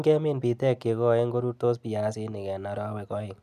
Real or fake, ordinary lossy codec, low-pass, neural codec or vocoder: real; none; 14.4 kHz; none